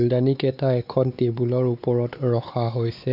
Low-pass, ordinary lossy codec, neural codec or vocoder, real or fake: 5.4 kHz; none; codec, 16 kHz, 4 kbps, X-Codec, WavLM features, trained on Multilingual LibriSpeech; fake